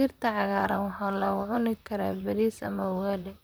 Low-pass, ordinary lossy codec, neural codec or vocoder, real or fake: none; none; vocoder, 44.1 kHz, 128 mel bands, Pupu-Vocoder; fake